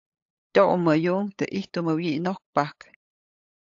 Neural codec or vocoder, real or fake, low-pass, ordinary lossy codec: codec, 16 kHz, 8 kbps, FunCodec, trained on LibriTTS, 25 frames a second; fake; 7.2 kHz; AAC, 48 kbps